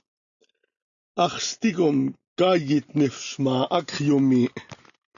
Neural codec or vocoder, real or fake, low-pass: none; real; 7.2 kHz